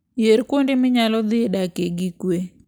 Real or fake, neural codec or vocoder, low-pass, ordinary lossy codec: real; none; none; none